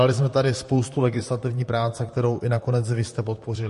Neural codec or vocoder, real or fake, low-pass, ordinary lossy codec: vocoder, 44.1 kHz, 128 mel bands, Pupu-Vocoder; fake; 14.4 kHz; MP3, 48 kbps